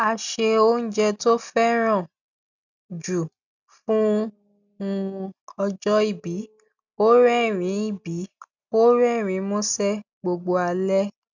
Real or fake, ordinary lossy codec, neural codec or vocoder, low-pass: real; none; none; 7.2 kHz